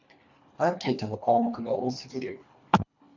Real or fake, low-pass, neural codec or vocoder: fake; 7.2 kHz; codec, 24 kHz, 1.5 kbps, HILCodec